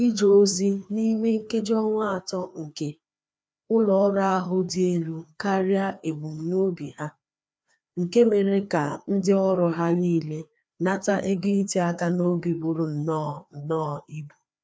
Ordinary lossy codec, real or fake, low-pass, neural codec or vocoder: none; fake; none; codec, 16 kHz, 2 kbps, FreqCodec, larger model